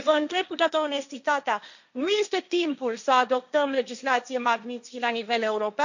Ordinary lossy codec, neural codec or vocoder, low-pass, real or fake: none; codec, 16 kHz, 1.1 kbps, Voila-Tokenizer; 7.2 kHz; fake